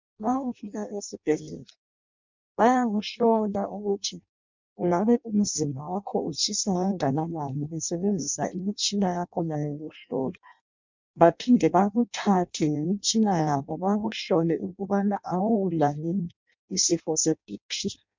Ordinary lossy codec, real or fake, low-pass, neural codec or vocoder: MP3, 64 kbps; fake; 7.2 kHz; codec, 16 kHz in and 24 kHz out, 0.6 kbps, FireRedTTS-2 codec